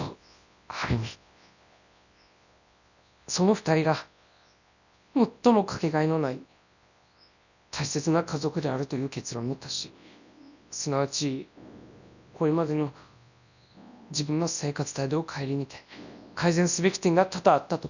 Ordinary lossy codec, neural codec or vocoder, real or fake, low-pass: none; codec, 24 kHz, 0.9 kbps, WavTokenizer, large speech release; fake; 7.2 kHz